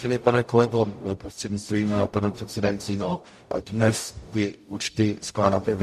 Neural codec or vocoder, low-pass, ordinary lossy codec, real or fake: codec, 44.1 kHz, 0.9 kbps, DAC; 14.4 kHz; MP3, 64 kbps; fake